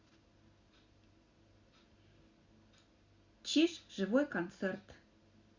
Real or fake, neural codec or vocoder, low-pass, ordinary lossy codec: real; none; 7.2 kHz; Opus, 64 kbps